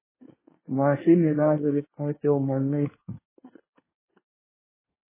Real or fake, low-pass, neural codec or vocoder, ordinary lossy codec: fake; 3.6 kHz; codec, 24 kHz, 1 kbps, SNAC; MP3, 16 kbps